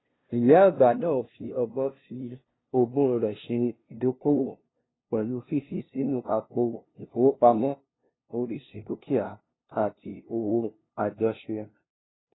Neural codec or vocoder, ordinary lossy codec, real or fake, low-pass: codec, 16 kHz, 0.5 kbps, FunCodec, trained on LibriTTS, 25 frames a second; AAC, 16 kbps; fake; 7.2 kHz